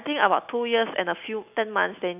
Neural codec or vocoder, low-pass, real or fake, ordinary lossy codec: none; 3.6 kHz; real; none